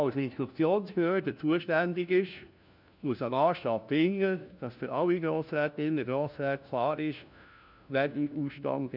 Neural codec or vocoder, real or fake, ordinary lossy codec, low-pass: codec, 16 kHz, 1 kbps, FunCodec, trained on LibriTTS, 50 frames a second; fake; none; 5.4 kHz